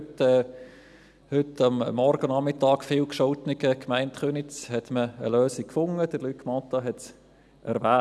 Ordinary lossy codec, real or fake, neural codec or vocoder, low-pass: none; real; none; none